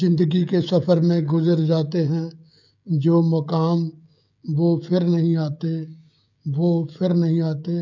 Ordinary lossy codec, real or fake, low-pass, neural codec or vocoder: none; fake; 7.2 kHz; codec, 16 kHz, 16 kbps, FreqCodec, smaller model